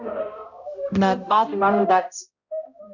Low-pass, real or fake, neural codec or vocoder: 7.2 kHz; fake; codec, 16 kHz, 0.5 kbps, X-Codec, HuBERT features, trained on balanced general audio